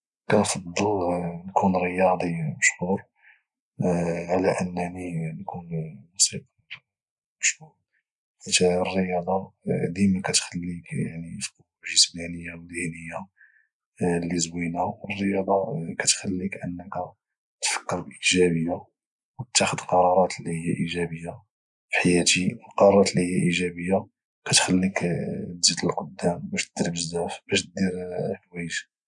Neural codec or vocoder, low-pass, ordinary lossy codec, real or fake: none; 10.8 kHz; none; real